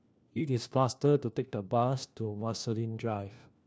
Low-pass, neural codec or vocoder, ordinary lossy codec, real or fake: none; codec, 16 kHz, 1 kbps, FunCodec, trained on LibriTTS, 50 frames a second; none; fake